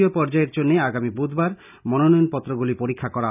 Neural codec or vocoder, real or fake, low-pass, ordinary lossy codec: none; real; 3.6 kHz; none